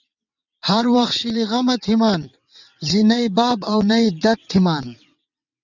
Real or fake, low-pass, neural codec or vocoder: fake; 7.2 kHz; vocoder, 22.05 kHz, 80 mel bands, WaveNeXt